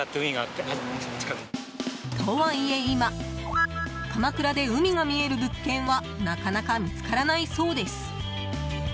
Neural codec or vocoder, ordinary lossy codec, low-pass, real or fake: none; none; none; real